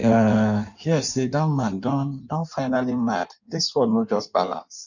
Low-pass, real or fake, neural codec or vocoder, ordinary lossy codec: 7.2 kHz; fake; codec, 16 kHz in and 24 kHz out, 1.1 kbps, FireRedTTS-2 codec; none